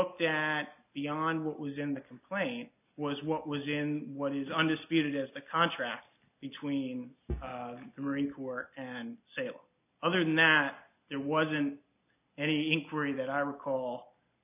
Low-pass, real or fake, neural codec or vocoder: 3.6 kHz; real; none